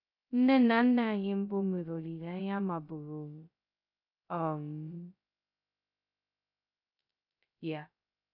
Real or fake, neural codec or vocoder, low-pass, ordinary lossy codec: fake; codec, 16 kHz, 0.2 kbps, FocalCodec; 5.4 kHz; none